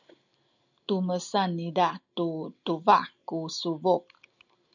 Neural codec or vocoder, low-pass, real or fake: none; 7.2 kHz; real